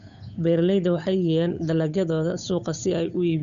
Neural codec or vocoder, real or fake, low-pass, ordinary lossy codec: codec, 16 kHz, 8 kbps, FunCodec, trained on Chinese and English, 25 frames a second; fake; 7.2 kHz; none